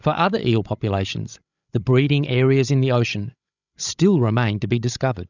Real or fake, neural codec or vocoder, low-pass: fake; codec, 16 kHz, 16 kbps, FreqCodec, larger model; 7.2 kHz